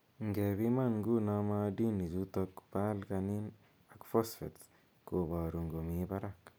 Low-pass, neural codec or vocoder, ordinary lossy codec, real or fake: none; none; none; real